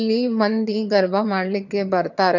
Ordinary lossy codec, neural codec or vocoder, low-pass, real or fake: none; vocoder, 22.05 kHz, 80 mel bands, HiFi-GAN; 7.2 kHz; fake